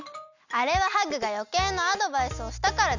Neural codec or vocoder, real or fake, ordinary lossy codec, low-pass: none; real; none; 7.2 kHz